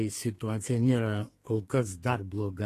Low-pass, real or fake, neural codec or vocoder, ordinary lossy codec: 14.4 kHz; fake; codec, 32 kHz, 1.9 kbps, SNAC; AAC, 48 kbps